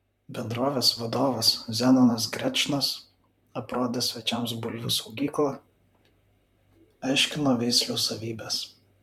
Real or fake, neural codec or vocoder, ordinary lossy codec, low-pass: fake; vocoder, 44.1 kHz, 128 mel bands, Pupu-Vocoder; MP3, 96 kbps; 19.8 kHz